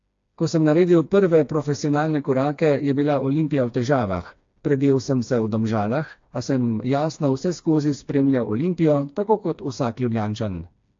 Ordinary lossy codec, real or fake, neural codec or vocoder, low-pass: AAC, 48 kbps; fake; codec, 16 kHz, 2 kbps, FreqCodec, smaller model; 7.2 kHz